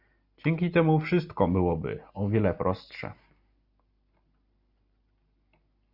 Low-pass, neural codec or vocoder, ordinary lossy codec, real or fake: 5.4 kHz; none; Opus, 64 kbps; real